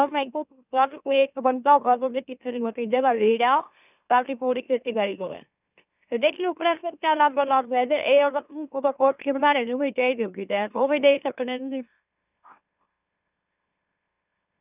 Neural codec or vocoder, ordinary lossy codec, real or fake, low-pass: autoencoder, 44.1 kHz, a latent of 192 numbers a frame, MeloTTS; none; fake; 3.6 kHz